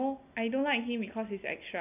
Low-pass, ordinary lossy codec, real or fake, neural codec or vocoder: 3.6 kHz; none; real; none